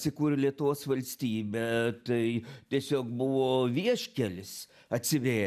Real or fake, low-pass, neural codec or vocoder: real; 14.4 kHz; none